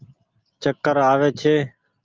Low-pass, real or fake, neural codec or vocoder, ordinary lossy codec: 7.2 kHz; real; none; Opus, 24 kbps